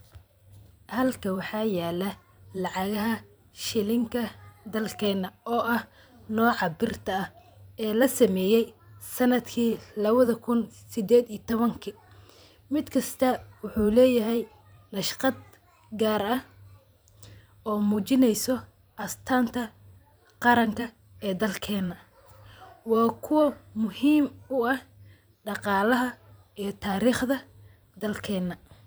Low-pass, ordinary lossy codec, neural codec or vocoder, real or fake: none; none; vocoder, 44.1 kHz, 128 mel bands every 512 samples, BigVGAN v2; fake